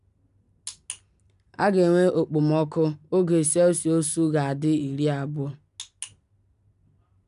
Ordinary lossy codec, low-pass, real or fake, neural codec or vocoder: none; 10.8 kHz; real; none